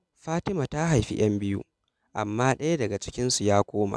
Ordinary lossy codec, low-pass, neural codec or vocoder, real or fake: none; none; none; real